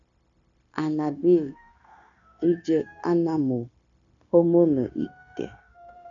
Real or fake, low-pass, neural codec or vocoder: fake; 7.2 kHz; codec, 16 kHz, 0.9 kbps, LongCat-Audio-Codec